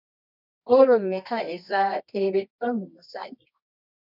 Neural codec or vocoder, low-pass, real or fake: codec, 24 kHz, 0.9 kbps, WavTokenizer, medium music audio release; 5.4 kHz; fake